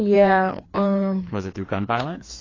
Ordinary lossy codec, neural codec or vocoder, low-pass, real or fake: AAC, 32 kbps; codec, 16 kHz, 2 kbps, FreqCodec, larger model; 7.2 kHz; fake